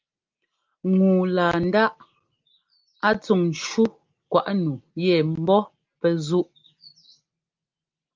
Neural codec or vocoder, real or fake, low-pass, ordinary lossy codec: none; real; 7.2 kHz; Opus, 24 kbps